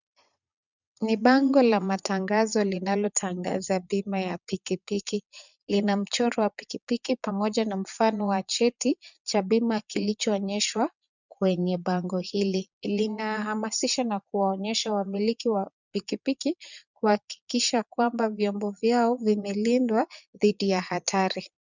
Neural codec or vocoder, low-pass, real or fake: vocoder, 22.05 kHz, 80 mel bands, Vocos; 7.2 kHz; fake